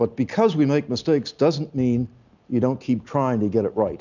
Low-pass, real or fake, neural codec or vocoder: 7.2 kHz; real; none